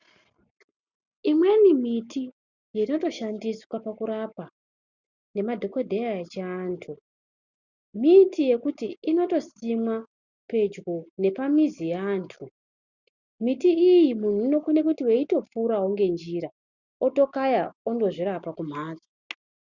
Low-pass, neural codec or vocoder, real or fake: 7.2 kHz; none; real